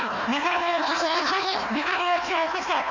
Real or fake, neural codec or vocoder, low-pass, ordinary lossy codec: fake; codec, 16 kHz, 1 kbps, FunCodec, trained on Chinese and English, 50 frames a second; 7.2 kHz; MP3, 64 kbps